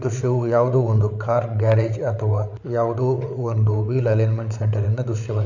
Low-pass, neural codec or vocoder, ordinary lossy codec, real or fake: 7.2 kHz; codec, 16 kHz, 8 kbps, FreqCodec, larger model; none; fake